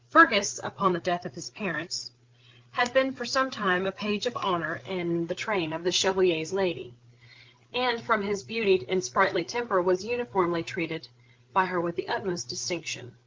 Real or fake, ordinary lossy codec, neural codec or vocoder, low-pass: fake; Opus, 32 kbps; codec, 16 kHz, 8 kbps, FreqCodec, larger model; 7.2 kHz